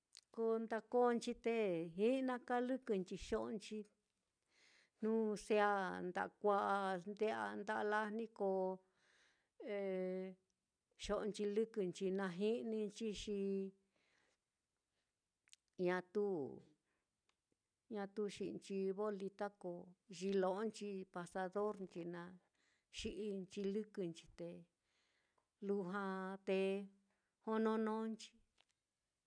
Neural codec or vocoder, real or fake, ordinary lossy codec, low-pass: none; real; none; 9.9 kHz